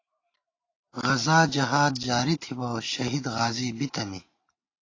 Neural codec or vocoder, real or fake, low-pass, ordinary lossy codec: none; real; 7.2 kHz; AAC, 32 kbps